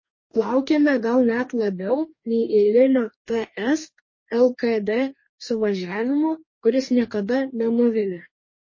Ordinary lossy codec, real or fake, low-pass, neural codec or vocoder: MP3, 32 kbps; fake; 7.2 kHz; codec, 44.1 kHz, 2.6 kbps, DAC